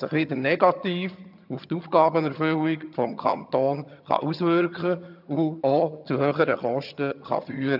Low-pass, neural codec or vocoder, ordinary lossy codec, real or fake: 5.4 kHz; vocoder, 22.05 kHz, 80 mel bands, HiFi-GAN; none; fake